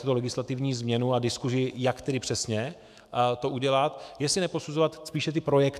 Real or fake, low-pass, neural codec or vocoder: fake; 14.4 kHz; autoencoder, 48 kHz, 128 numbers a frame, DAC-VAE, trained on Japanese speech